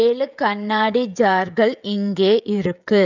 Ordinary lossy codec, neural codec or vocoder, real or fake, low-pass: none; codec, 16 kHz, 16 kbps, FreqCodec, smaller model; fake; 7.2 kHz